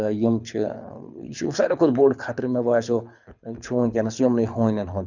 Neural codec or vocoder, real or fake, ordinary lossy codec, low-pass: codec, 24 kHz, 6 kbps, HILCodec; fake; none; 7.2 kHz